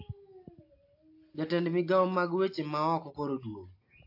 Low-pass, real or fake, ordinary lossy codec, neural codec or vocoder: 5.4 kHz; real; AAC, 32 kbps; none